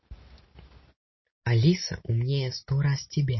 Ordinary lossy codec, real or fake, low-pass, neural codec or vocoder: MP3, 24 kbps; real; 7.2 kHz; none